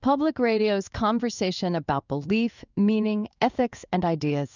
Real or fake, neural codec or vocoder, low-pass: fake; codec, 16 kHz in and 24 kHz out, 1 kbps, XY-Tokenizer; 7.2 kHz